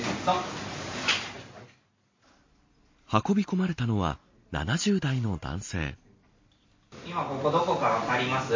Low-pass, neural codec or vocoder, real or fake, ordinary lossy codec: 7.2 kHz; none; real; MP3, 32 kbps